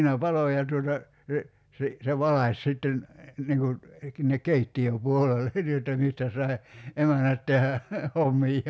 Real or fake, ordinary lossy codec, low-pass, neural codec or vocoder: real; none; none; none